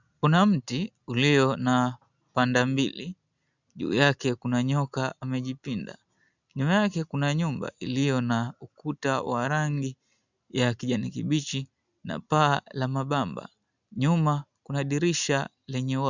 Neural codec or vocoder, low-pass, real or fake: none; 7.2 kHz; real